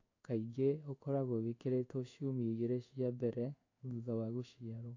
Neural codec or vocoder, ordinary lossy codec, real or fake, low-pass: codec, 16 kHz in and 24 kHz out, 1 kbps, XY-Tokenizer; AAC, 48 kbps; fake; 7.2 kHz